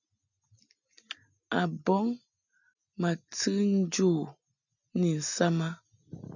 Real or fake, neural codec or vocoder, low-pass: real; none; 7.2 kHz